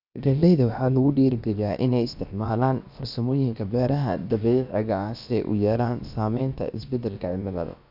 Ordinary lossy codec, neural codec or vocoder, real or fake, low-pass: none; codec, 16 kHz, about 1 kbps, DyCAST, with the encoder's durations; fake; 5.4 kHz